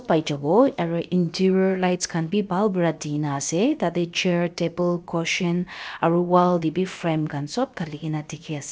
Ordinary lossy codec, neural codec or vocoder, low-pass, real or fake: none; codec, 16 kHz, about 1 kbps, DyCAST, with the encoder's durations; none; fake